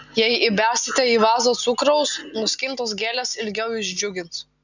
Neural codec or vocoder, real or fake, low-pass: none; real; 7.2 kHz